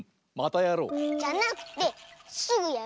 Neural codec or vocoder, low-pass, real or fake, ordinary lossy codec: none; none; real; none